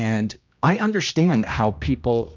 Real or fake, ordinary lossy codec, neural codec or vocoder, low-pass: fake; MP3, 64 kbps; codec, 16 kHz, 1 kbps, X-Codec, HuBERT features, trained on general audio; 7.2 kHz